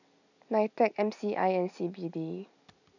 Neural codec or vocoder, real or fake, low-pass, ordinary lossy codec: none; real; 7.2 kHz; none